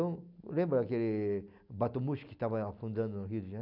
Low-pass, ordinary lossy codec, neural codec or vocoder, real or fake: 5.4 kHz; none; none; real